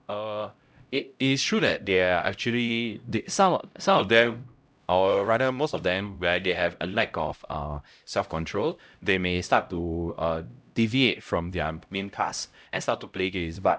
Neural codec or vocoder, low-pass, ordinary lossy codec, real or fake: codec, 16 kHz, 0.5 kbps, X-Codec, HuBERT features, trained on LibriSpeech; none; none; fake